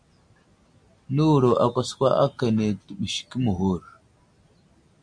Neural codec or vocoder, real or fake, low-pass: none; real; 9.9 kHz